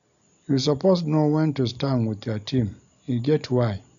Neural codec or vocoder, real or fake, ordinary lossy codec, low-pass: none; real; none; 7.2 kHz